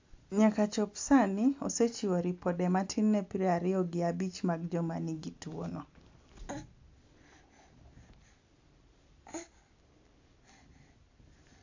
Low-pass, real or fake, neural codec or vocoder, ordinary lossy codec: 7.2 kHz; real; none; AAC, 48 kbps